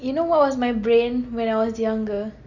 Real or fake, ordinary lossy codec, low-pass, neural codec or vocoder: real; none; 7.2 kHz; none